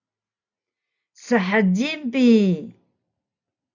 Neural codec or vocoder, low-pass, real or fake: none; 7.2 kHz; real